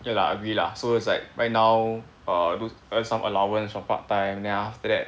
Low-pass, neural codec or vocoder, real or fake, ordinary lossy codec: none; none; real; none